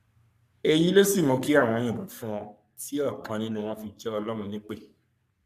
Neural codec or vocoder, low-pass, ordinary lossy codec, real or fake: codec, 44.1 kHz, 3.4 kbps, Pupu-Codec; 14.4 kHz; none; fake